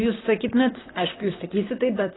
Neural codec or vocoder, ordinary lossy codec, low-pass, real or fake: vocoder, 44.1 kHz, 128 mel bands, Pupu-Vocoder; AAC, 16 kbps; 7.2 kHz; fake